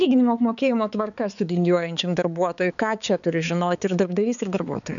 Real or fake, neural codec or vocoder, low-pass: fake; codec, 16 kHz, 4 kbps, X-Codec, HuBERT features, trained on balanced general audio; 7.2 kHz